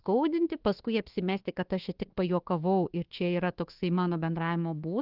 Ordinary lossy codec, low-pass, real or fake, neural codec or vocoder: Opus, 16 kbps; 5.4 kHz; fake; codec, 24 kHz, 1.2 kbps, DualCodec